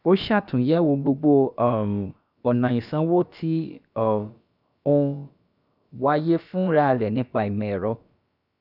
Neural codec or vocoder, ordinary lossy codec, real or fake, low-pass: codec, 16 kHz, about 1 kbps, DyCAST, with the encoder's durations; none; fake; 5.4 kHz